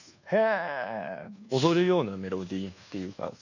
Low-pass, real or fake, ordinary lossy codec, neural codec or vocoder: 7.2 kHz; fake; none; codec, 24 kHz, 1.2 kbps, DualCodec